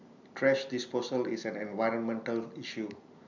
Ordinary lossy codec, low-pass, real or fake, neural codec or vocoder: none; 7.2 kHz; real; none